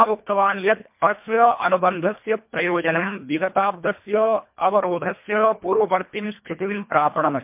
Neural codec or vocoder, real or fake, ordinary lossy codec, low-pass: codec, 24 kHz, 1.5 kbps, HILCodec; fake; MP3, 32 kbps; 3.6 kHz